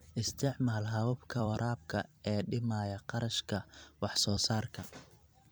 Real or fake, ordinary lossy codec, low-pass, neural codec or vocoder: fake; none; none; vocoder, 44.1 kHz, 128 mel bands every 256 samples, BigVGAN v2